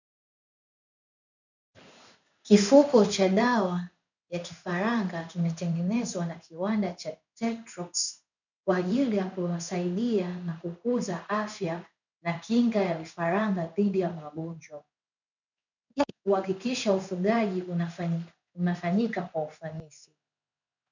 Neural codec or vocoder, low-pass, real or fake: codec, 16 kHz in and 24 kHz out, 1 kbps, XY-Tokenizer; 7.2 kHz; fake